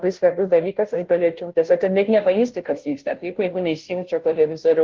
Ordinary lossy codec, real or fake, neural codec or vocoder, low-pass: Opus, 16 kbps; fake; codec, 16 kHz, 0.5 kbps, FunCodec, trained on Chinese and English, 25 frames a second; 7.2 kHz